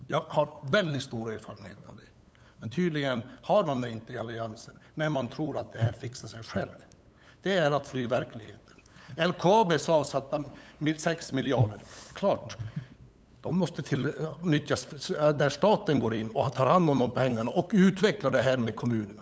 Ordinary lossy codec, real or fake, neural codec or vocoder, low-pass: none; fake; codec, 16 kHz, 8 kbps, FunCodec, trained on LibriTTS, 25 frames a second; none